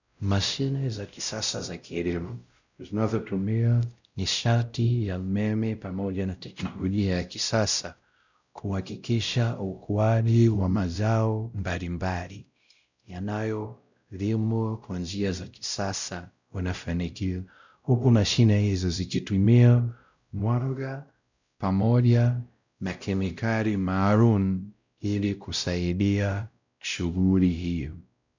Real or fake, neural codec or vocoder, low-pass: fake; codec, 16 kHz, 0.5 kbps, X-Codec, WavLM features, trained on Multilingual LibriSpeech; 7.2 kHz